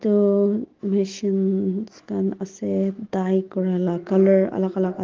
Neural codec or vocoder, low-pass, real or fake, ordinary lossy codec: autoencoder, 48 kHz, 128 numbers a frame, DAC-VAE, trained on Japanese speech; 7.2 kHz; fake; Opus, 16 kbps